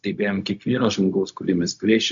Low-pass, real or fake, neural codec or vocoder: 7.2 kHz; fake; codec, 16 kHz, 0.4 kbps, LongCat-Audio-Codec